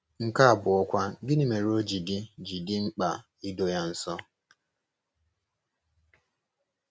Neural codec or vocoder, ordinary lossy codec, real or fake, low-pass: none; none; real; none